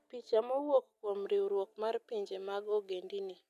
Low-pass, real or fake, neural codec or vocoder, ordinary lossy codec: 14.4 kHz; real; none; none